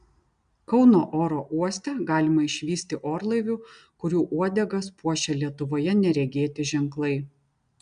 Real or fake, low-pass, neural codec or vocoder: real; 9.9 kHz; none